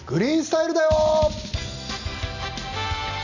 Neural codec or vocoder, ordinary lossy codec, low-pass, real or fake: none; none; 7.2 kHz; real